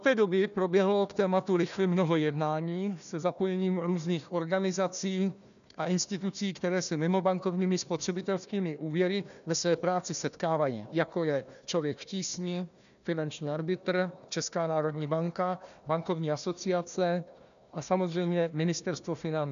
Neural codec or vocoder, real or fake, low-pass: codec, 16 kHz, 1 kbps, FunCodec, trained on Chinese and English, 50 frames a second; fake; 7.2 kHz